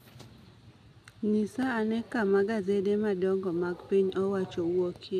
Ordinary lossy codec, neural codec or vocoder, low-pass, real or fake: none; none; 14.4 kHz; real